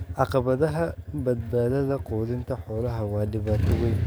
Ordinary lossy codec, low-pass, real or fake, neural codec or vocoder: none; none; fake; codec, 44.1 kHz, 7.8 kbps, DAC